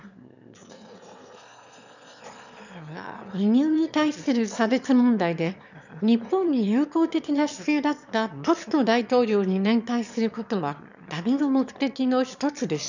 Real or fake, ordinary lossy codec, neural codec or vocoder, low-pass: fake; none; autoencoder, 22.05 kHz, a latent of 192 numbers a frame, VITS, trained on one speaker; 7.2 kHz